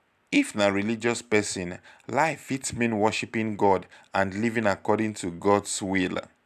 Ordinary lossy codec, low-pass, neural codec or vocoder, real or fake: none; 14.4 kHz; none; real